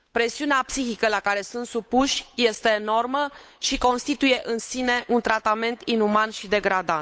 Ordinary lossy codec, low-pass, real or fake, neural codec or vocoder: none; none; fake; codec, 16 kHz, 8 kbps, FunCodec, trained on Chinese and English, 25 frames a second